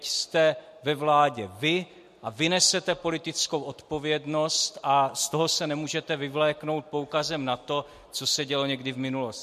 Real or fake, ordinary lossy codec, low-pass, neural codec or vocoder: real; MP3, 64 kbps; 14.4 kHz; none